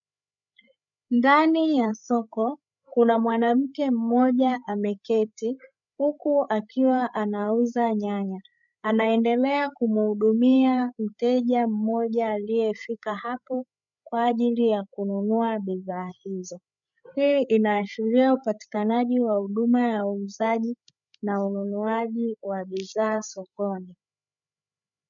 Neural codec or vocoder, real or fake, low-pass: codec, 16 kHz, 8 kbps, FreqCodec, larger model; fake; 7.2 kHz